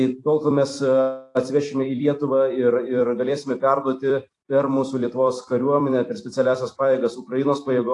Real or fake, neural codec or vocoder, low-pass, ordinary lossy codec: fake; vocoder, 44.1 kHz, 128 mel bands every 256 samples, BigVGAN v2; 10.8 kHz; AAC, 48 kbps